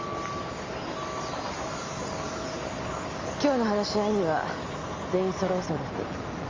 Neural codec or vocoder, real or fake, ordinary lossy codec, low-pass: none; real; Opus, 32 kbps; 7.2 kHz